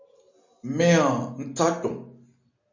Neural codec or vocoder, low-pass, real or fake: none; 7.2 kHz; real